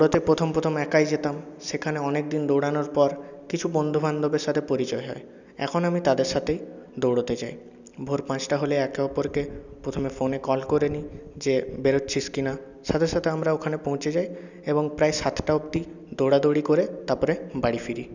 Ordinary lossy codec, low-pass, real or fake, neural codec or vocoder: none; 7.2 kHz; real; none